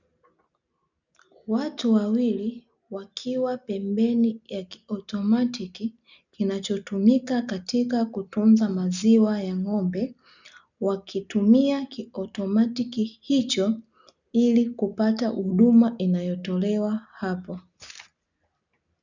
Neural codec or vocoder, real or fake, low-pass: none; real; 7.2 kHz